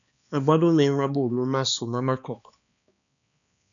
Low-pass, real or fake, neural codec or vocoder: 7.2 kHz; fake; codec, 16 kHz, 2 kbps, X-Codec, HuBERT features, trained on balanced general audio